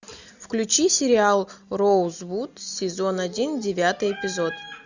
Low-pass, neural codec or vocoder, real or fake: 7.2 kHz; none; real